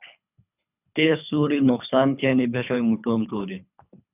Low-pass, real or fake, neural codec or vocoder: 3.6 kHz; fake; codec, 24 kHz, 3 kbps, HILCodec